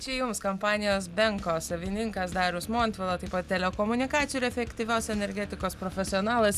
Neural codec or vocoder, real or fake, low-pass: none; real; 14.4 kHz